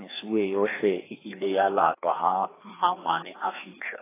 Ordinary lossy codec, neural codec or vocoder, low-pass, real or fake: AAC, 16 kbps; codec, 16 kHz, 2 kbps, FreqCodec, larger model; 3.6 kHz; fake